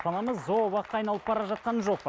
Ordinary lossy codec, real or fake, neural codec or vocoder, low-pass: none; real; none; none